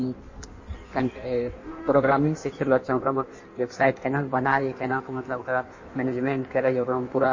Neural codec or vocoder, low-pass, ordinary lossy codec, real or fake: codec, 16 kHz in and 24 kHz out, 1.1 kbps, FireRedTTS-2 codec; 7.2 kHz; MP3, 32 kbps; fake